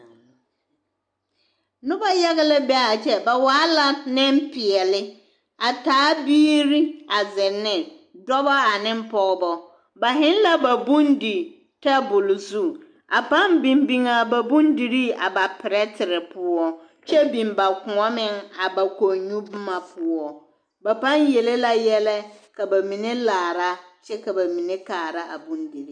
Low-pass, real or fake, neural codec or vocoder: 9.9 kHz; real; none